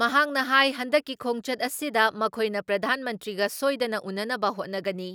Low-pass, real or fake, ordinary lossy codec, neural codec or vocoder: none; real; none; none